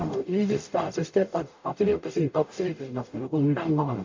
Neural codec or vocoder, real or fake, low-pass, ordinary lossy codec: codec, 44.1 kHz, 0.9 kbps, DAC; fake; 7.2 kHz; MP3, 48 kbps